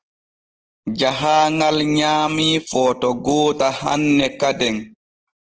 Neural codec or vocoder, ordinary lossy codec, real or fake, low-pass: none; Opus, 16 kbps; real; 7.2 kHz